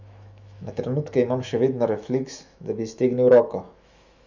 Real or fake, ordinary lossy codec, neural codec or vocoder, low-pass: fake; none; autoencoder, 48 kHz, 128 numbers a frame, DAC-VAE, trained on Japanese speech; 7.2 kHz